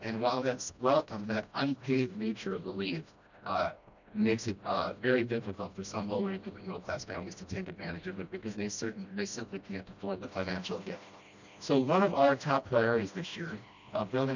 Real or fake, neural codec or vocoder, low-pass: fake; codec, 16 kHz, 1 kbps, FreqCodec, smaller model; 7.2 kHz